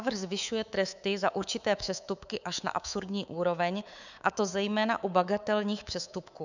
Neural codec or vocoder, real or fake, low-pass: codec, 24 kHz, 3.1 kbps, DualCodec; fake; 7.2 kHz